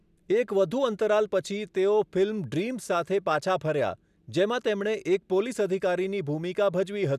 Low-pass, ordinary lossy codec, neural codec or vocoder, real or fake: 14.4 kHz; none; none; real